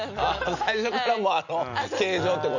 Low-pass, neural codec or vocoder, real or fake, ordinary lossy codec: 7.2 kHz; none; real; none